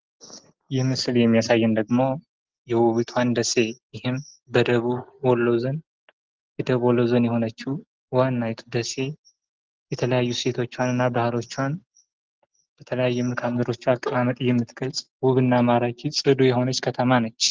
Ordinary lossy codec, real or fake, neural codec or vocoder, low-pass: Opus, 16 kbps; real; none; 7.2 kHz